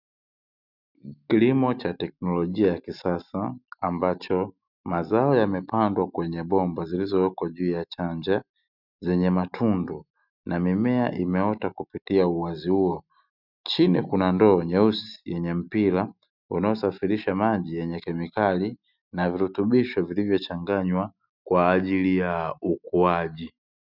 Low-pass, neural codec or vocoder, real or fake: 5.4 kHz; none; real